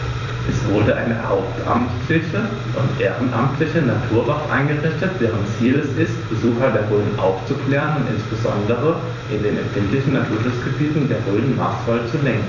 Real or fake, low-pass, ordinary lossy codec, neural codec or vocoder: fake; 7.2 kHz; none; vocoder, 44.1 kHz, 128 mel bands every 256 samples, BigVGAN v2